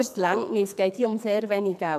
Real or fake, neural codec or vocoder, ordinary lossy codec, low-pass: fake; codec, 44.1 kHz, 2.6 kbps, SNAC; none; 14.4 kHz